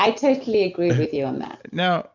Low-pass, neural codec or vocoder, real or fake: 7.2 kHz; none; real